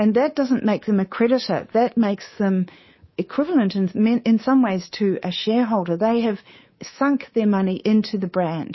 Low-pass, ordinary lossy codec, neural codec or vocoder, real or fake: 7.2 kHz; MP3, 24 kbps; codec, 24 kHz, 3.1 kbps, DualCodec; fake